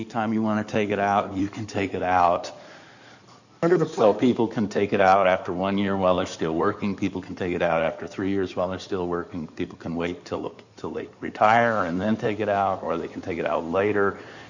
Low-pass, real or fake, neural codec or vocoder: 7.2 kHz; fake; codec, 16 kHz in and 24 kHz out, 2.2 kbps, FireRedTTS-2 codec